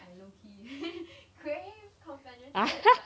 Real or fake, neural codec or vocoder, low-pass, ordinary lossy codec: real; none; none; none